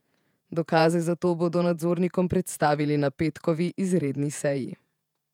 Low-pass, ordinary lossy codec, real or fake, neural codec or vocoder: 19.8 kHz; none; fake; vocoder, 48 kHz, 128 mel bands, Vocos